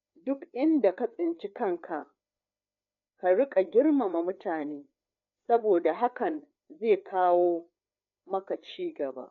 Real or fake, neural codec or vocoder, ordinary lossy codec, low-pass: fake; codec, 16 kHz, 4 kbps, FreqCodec, larger model; none; 7.2 kHz